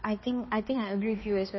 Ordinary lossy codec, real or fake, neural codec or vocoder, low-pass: MP3, 24 kbps; fake; codec, 16 kHz, 2 kbps, X-Codec, HuBERT features, trained on balanced general audio; 7.2 kHz